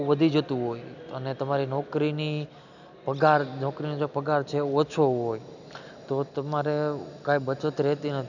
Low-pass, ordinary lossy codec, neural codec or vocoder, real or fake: 7.2 kHz; none; none; real